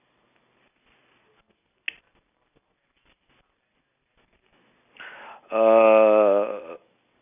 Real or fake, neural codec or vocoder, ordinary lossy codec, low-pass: real; none; none; 3.6 kHz